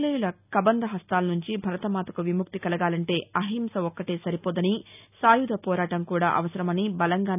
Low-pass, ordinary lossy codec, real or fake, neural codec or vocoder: 3.6 kHz; none; real; none